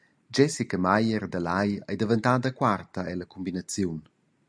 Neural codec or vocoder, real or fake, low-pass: none; real; 9.9 kHz